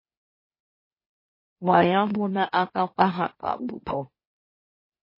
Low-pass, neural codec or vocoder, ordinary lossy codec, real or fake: 5.4 kHz; autoencoder, 44.1 kHz, a latent of 192 numbers a frame, MeloTTS; MP3, 24 kbps; fake